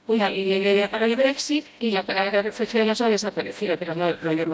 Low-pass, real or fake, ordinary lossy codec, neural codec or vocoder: none; fake; none; codec, 16 kHz, 0.5 kbps, FreqCodec, smaller model